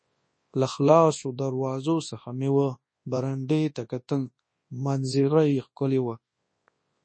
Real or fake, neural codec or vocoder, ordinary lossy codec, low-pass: fake; codec, 24 kHz, 0.9 kbps, WavTokenizer, large speech release; MP3, 32 kbps; 9.9 kHz